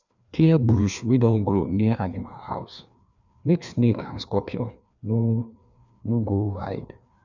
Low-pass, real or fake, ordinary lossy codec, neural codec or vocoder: 7.2 kHz; fake; none; codec, 16 kHz, 2 kbps, FreqCodec, larger model